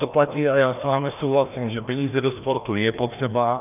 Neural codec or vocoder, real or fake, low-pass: codec, 16 kHz, 1 kbps, FreqCodec, larger model; fake; 3.6 kHz